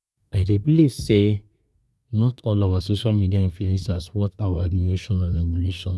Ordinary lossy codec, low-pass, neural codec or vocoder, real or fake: none; none; codec, 24 kHz, 1 kbps, SNAC; fake